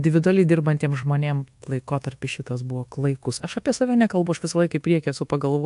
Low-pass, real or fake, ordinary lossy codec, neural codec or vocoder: 10.8 kHz; fake; AAC, 64 kbps; codec, 24 kHz, 1.2 kbps, DualCodec